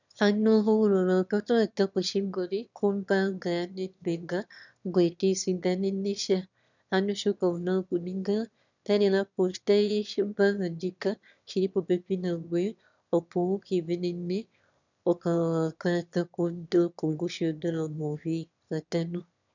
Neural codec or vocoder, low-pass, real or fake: autoencoder, 22.05 kHz, a latent of 192 numbers a frame, VITS, trained on one speaker; 7.2 kHz; fake